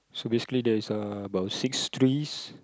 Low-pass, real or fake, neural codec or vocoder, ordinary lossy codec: none; real; none; none